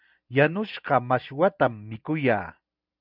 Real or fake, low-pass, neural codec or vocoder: real; 5.4 kHz; none